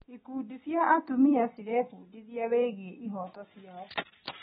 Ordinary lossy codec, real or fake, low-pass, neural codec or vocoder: AAC, 16 kbps; real; 7.2 kHz; none